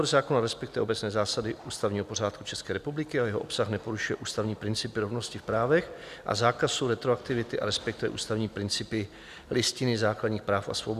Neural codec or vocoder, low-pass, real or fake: none; 14.4 kHz; real